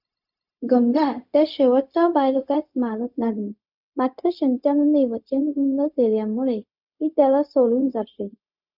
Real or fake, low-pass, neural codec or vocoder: fake; 5.4 kHz; codec, 16 kHz, 0.4 kbps, LongCat-Audio-Codec